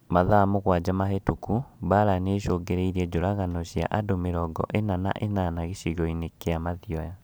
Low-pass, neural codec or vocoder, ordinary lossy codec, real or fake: none; none; none; real